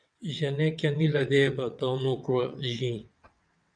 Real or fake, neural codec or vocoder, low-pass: fake; vocoder, 22.05 kHz, 80 mel bands, WaveNeXt; 9.9 kHz